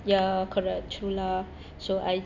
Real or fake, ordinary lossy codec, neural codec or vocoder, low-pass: real; none; none; 7.2 kHz